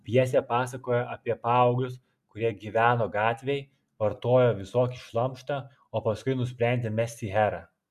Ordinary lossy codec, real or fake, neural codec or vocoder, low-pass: MP3, 96 kbps; real; none; 14.4 kHz